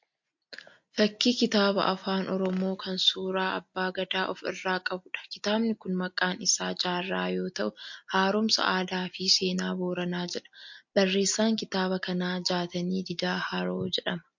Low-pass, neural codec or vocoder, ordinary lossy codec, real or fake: 7.2 kHz; none; MP3, 48 kbps; real